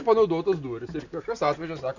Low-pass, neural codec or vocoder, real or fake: 7.2 kHz; none; real